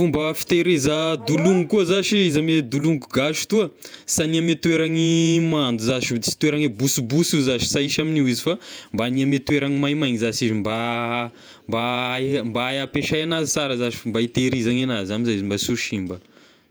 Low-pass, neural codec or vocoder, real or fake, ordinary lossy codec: none; vocoder, 48 kHz, 128 mel bands, Vocos; fake; none